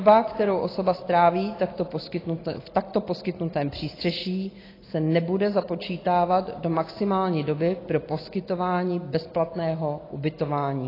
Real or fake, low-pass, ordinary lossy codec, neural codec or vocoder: real; 5.4 kHz; AAC, 24 kbps; none